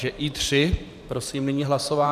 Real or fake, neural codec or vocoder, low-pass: fake; vocoder, 44.1 kHz, 128 mel bands every 512 samples, BigVGAN v2; 14.4 kHz